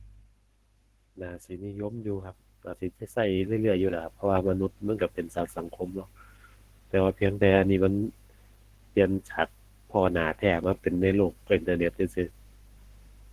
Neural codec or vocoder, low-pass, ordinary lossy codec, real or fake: codec, 44.1 kHz, 7.8 kbps, Pupu-Codec; 14.4 kHz; Opus, 16 kbps; fake